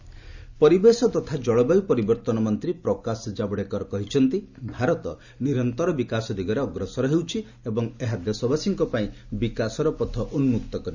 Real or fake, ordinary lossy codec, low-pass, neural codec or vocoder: real; Opus, 64 kbps; 7.2 kHz; none